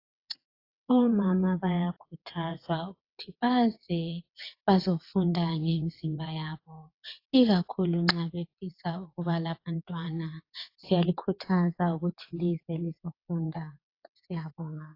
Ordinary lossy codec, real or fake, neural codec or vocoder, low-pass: AAC, 32 kbps; fake; vocoder, 22.05 kHz, 80 mel bands, WaveNeXt; 5.4 kHz